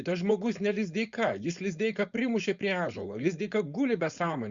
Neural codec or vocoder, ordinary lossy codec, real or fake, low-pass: codec, 16 kHz, 4.8 kbps, FACodec; Opus, 64 kbps; fake; 7.2 kHz